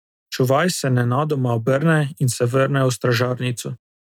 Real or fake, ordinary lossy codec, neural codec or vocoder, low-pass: real; none; none; 19.8 kHz